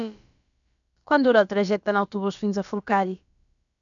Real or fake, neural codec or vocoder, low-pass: fake; codec, 16 kHz, about 1 kbps, DyCAST, with the encoder's durations; 7.2 kHz